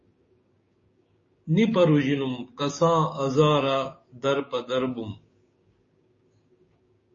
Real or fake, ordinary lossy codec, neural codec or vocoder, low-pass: fake; MP3, 32 kbps; codec, 16 kHz, 6 kbps, DAC; 7.2 kHz